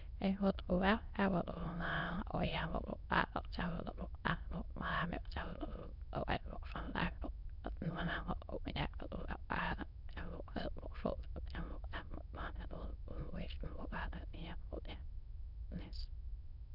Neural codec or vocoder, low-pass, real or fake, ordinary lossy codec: autoencoder, 22.05 kHz, a latent of 192 numbers a frame, VITS, trained on many speakers; 5.4 kHz; fake; none